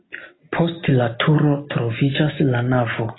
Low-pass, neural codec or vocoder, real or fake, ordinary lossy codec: 7.2 kHz; none; real; AAC, 16 kbps